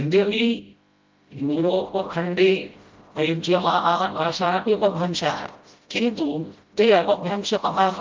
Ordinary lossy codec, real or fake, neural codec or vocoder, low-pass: Opus, 24 kbps; fake; codec, 16 kHz, 0.5 kbps, FreqCodec, smaller model; 7.2 kHz